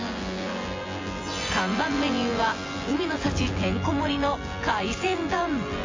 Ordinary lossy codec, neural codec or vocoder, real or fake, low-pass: AAC, 32 kbps; vocoder, 24 kHz, 100 mel bands, Vocos; fake; 7.2 kHz